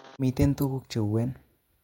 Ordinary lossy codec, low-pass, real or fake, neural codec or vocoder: MP3, 64 kbps; 19.8 kHz; fake; vocoder, 48 kHz, 128 mel bands, Vocos